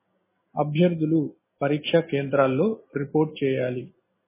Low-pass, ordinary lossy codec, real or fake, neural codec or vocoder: 3.6 kHz; MP3, 16 kbps; real; none